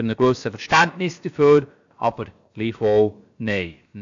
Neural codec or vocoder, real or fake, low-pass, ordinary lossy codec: codec, 16 kHz, about 1 kbps, DyCAST, with the encoder's durations; fake; 7.2 kHz; AAC, 64 kbps